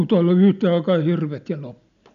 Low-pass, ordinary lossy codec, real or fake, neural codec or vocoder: 7.2 kHz; none; real; none